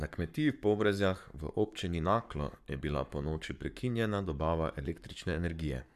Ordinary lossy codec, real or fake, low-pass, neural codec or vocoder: none; fake; 14.4 kHz; codec, 44.1 kHz, 7.8 kbps, Pupu-Codec